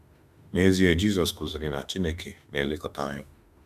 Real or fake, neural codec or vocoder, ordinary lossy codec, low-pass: fake; autoencoder, 48 kHz, 32 numbers a frame, DAC-VAE, trained on Japanese speech; none; 14.4 kHz